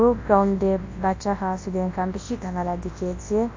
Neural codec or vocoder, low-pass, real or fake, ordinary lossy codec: codec, 24 kHz, 0.9 kbps, WavTokenizer, large speech release; 7.2 kHz; fake; AAC, 32 kbps